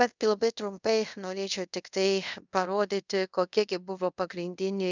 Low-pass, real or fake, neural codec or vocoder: 7.2 kHz; fake; codec, 24 kHz, 0.5 kbps, DualCodec